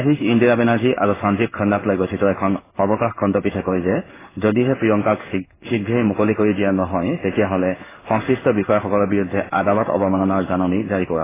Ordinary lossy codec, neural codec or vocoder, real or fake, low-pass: AAC, 16 kbps; codec, 16 kHz in and 24 kHz out, 1 kbps, XY-Tokenizer; fake; 3.6 kHz